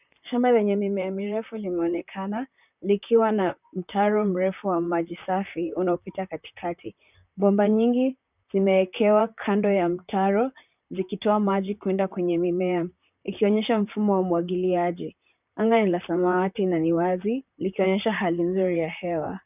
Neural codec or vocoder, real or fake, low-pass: vocoder, 44.1 kHz, 128 mel bands, Pupu-Vocoder; fake; 3.6 kHz